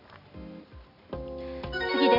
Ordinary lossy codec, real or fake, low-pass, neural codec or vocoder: none; real; 5.4 kHz; none